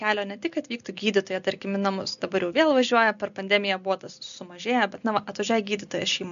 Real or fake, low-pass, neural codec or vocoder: real; 7.2 kHz; none